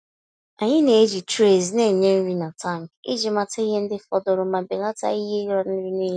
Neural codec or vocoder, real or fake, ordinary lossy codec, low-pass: none; real; none; 9.9 kHz